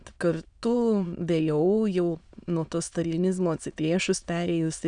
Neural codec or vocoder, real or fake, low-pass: autoencoder, 22.05 kHz, a latent of 192 numbers a frame, VITS, trained on many speakers; fake; 9.9 kHz